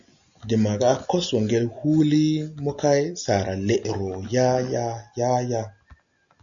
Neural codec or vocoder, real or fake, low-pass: none; real; 7.2 kHz